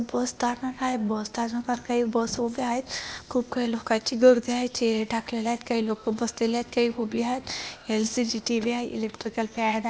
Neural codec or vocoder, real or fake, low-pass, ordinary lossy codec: codec, 16 kHz, 0.8 kbps, ZipCodec; fake; none; none